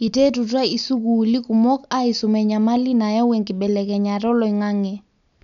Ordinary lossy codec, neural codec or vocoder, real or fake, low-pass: none; none; real; 7.2 kHz